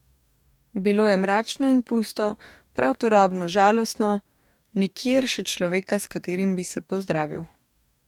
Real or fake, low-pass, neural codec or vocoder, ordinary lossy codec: fake; 19.8 kHz; codec, 44.1 kHz, 2.6 kbps, DAC; none